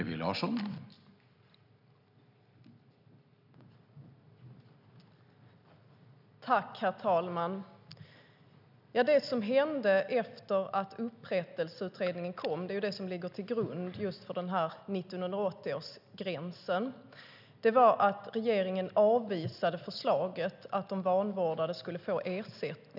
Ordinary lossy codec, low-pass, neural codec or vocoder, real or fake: none; 5.4 kHz; none; real